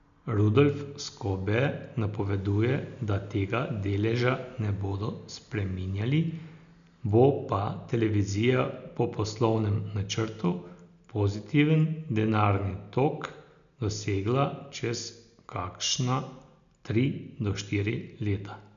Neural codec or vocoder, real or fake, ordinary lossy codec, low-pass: none; real; none; 7.2 kHz